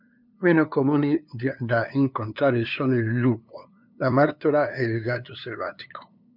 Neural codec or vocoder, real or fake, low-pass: codec, 16 kHz, 2 kbps, FunCodec, trained on LibriTTS, 25 frames a second; fake; 5.4 kHz